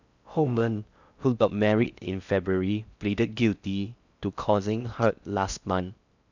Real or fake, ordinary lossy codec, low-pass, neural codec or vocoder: fake; none; 7.2 kHz; codec, 16 kHz in and 24 kHz out, 0.6 kbps, FocalCodec, streaming, 4096 codes